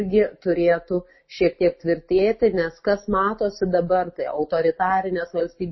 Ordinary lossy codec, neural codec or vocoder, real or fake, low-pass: MP3, 24 kbps; none; real; 7.2 kHz